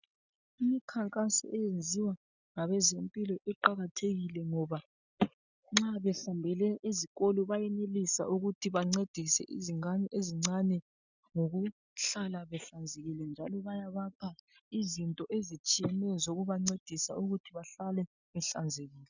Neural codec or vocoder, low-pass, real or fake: none; 7.2 kHz; real